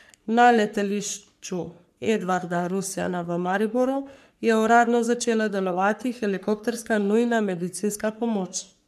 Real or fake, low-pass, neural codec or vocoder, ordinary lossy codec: fake; 14.4 kHz; codec, 44.1 kHz, 3.4 kbps, Pupu-Codec; none